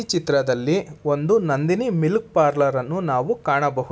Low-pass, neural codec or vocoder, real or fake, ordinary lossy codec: none; none; real; none